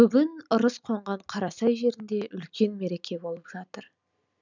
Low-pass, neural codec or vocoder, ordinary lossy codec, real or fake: 7.2 kHz; none; none; real